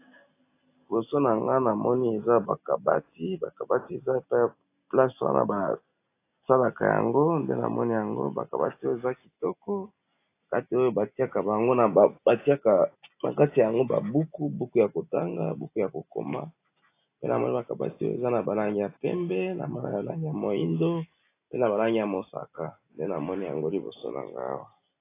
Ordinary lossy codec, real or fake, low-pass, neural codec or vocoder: AAC, 24 kbps; real; 3.6 kHz; none